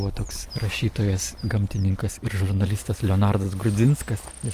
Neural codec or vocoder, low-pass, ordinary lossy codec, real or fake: vocoder, 44.1 kHz, 128 mel bands every 256 samples, BigVGAN v2; 14.4 kHz; Opus, 32 kbps; fake